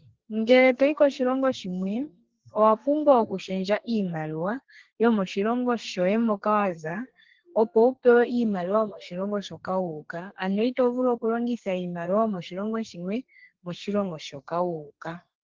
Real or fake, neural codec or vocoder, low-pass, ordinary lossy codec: fake; codec, 32 kHz, 1.9 kbps, SNAC; 7.2 kHz; Opus, 16 kbps